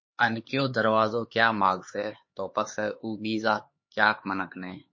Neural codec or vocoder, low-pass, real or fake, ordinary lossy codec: codec, 16 kHz, 4 kbps, X-Codec, HuBERT features, trained on LibriSpeech; 7.2 kHz; fake; MP3, 32 kbps